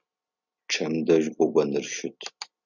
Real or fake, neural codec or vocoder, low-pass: real; none; 7.2 kHz